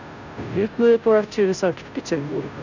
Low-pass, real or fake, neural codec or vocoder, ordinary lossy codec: 7.2 kHz; fake; codec, 16 kHz, 0.5 kbps, FunCodec, trained on Chinese and English, 25 frames a second; none